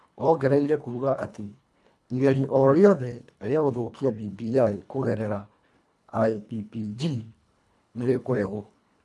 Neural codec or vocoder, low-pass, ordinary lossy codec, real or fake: codec, 24 kHz, 1.5 kbps, HILCodec; none; none; fake